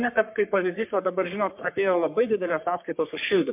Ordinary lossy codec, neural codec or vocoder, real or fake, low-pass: MP3, 32 kbps; codec, 44.1 kHz, 3.4 kbps, Pupu-Codec; fake; 3.6 kHz